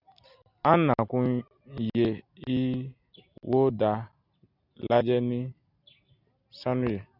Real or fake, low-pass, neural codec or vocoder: real; 5.4 kHz; none